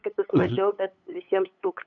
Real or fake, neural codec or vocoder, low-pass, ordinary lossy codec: fake; codec, 16 kHz, 8 kbps, FunCodec, trained on LibriTTS, 25 frames a second; 7.2 kHz; MP3, 96 kbps